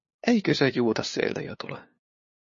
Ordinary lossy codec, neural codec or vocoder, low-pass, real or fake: MP3, 32 kbps; codec, 16 kHz, 2 kbps, FunCodec, trained on LibriTTS, 25 frames a second; 7.2 kHz; fake